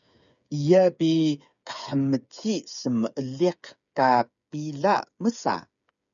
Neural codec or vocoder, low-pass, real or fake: codec, 16 kHz, 8 kbps, FreqCodec, smaller model; 7.2 kHz; fake